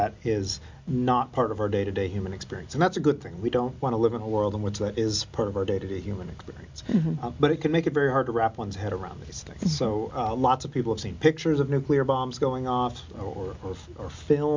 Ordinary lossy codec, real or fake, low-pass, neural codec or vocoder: MP3, 64 kbps; real; 7.2 kHz; none